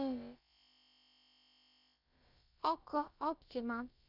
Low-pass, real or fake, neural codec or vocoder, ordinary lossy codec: 5.4 kHz; fake; codec, 16 kHz, about 1 kbps, DyCAST, with the encoder's durations; none